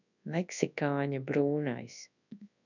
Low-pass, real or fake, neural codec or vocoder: 7.2 kHz; fake; codec, 24 kHz, 0.9 kbps, WavTokenizer, large speech release